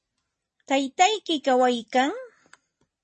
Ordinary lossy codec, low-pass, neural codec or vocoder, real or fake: MP3, 32 kbps; 10.8 kHz; none; real